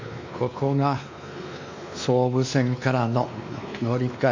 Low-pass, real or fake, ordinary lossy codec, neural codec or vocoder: 7.2 kHz; fake; MP3, 48 kbps; codec, 16 kHz, 2 kbps, X-Codec, WavLM features, trained on Multilingual LibriSpeech